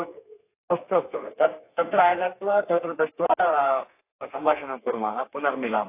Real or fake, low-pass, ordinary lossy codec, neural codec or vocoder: fake; 3.6 kHz; AAC, 24 kbps; codec, 32 kHz, 1.9 kbps, SNAC